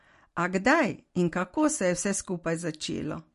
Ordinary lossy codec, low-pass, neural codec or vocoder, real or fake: MP3, 48 kbps; 14.4 kHz; none; real